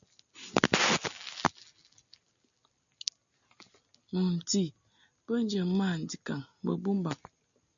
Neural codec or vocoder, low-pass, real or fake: none; 7.2 kHz; real